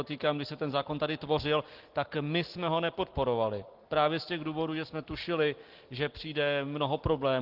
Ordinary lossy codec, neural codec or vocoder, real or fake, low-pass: Opus, 16 kbps; none; real; 5.4 kHz